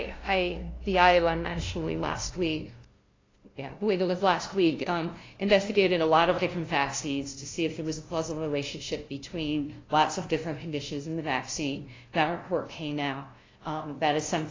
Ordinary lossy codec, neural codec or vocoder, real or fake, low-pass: AAC, 32 kbps; codec, 16 kHz, 0.5 kbps, FunCodec, trained on LibriTTS, 25 frames a second; fake; 7.2 kHz